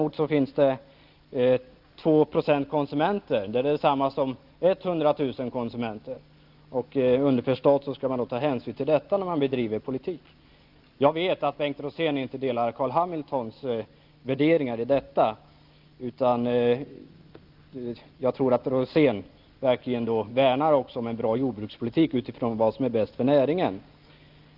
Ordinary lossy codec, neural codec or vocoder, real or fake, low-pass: Opus, 32 kbps; none; real; 5.4 kHz